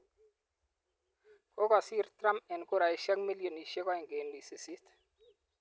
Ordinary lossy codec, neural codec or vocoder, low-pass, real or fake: none; none; none; real